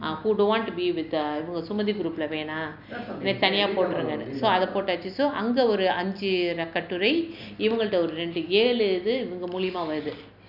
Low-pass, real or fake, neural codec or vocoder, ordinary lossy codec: 5.4 kHz; real; none; none